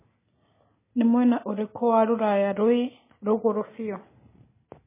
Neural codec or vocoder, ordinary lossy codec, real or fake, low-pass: none; MP3, 16 kbps; real; 3.6 kHz